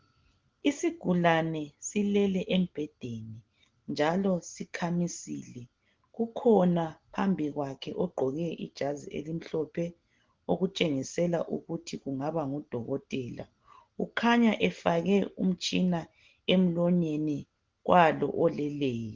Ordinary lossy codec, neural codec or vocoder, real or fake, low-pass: Opus, 16 kbps; none; real; 7.2 kHz